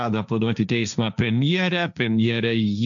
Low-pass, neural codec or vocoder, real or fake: 7.2 kHz; codec, 16 kHz, 1.1 kbps, Voila-Tokenizer; fake